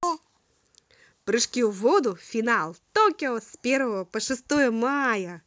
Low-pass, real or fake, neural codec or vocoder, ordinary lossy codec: none; real; none; none